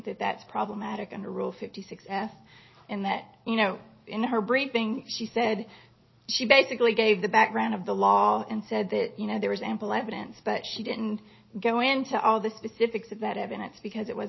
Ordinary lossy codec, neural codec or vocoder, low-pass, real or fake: MP3, 24 kbps; none; 7.2 kHz; real